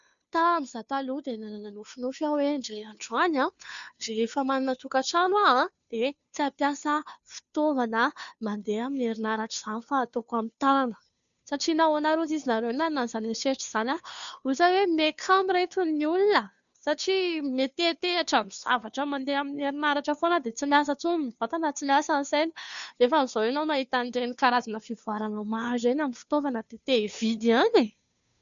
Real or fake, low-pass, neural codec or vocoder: fake; 7.2 kHz; codec, 16 kHz, 2 kbps, FunCodec, trained on Chinese and English, 25 frames a second